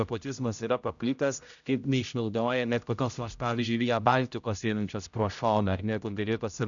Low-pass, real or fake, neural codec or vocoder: 7.2 kHz; fake; codec, 16 kHz, 0.5 kbps, X-Codec, HuBERT features, trained on general audio